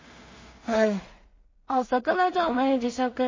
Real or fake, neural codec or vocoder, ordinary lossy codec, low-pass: fake; codec, 16 kHz in and 24 kHz out, 0.4 kbps, LongCat-Audio-Codec, two codebook decoder; MP3, 32 kbps; 7.2 kHz